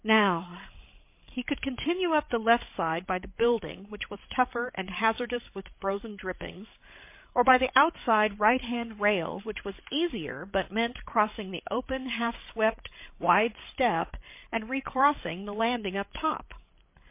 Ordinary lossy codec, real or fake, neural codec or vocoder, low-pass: MP3, 24 kbps; fake; codec, 16 kHz, 8 kbps, FreqCodec, larger model; 3.6 kHz